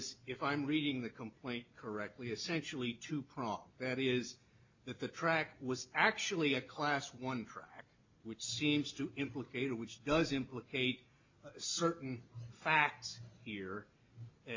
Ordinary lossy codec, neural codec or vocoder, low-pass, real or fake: MP3, 64 kbps; none; 7.2 kHz; real